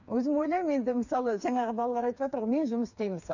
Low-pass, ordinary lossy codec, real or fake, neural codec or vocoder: 7.2 kHz; none; fake; codec, 16 kHz, 4 kbps, FreqCodec, smaller model